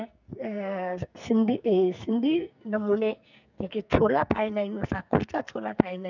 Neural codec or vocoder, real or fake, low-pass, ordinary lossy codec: codec, 44.1 kHz, 2.6 kbps, SNAC; fake; 7.2 kHz; none